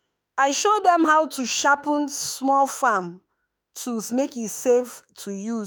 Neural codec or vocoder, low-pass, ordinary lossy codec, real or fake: autoencoder, 48 kHz, 32 numbers a frame, DAC-VAE, trained on Japanese speech; none; none; fake